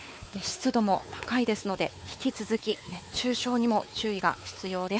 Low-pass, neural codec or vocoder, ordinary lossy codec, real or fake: none; codec, 16 kHz, 4 kbps, X-Codec, WavLM features, trained on Multilingual LibriSpeech; none; fake